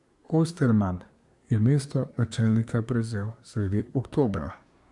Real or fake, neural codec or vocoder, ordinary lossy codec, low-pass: fake; codec, 24 kHz, 1 kbps, SNAC; none; 10.8 kHz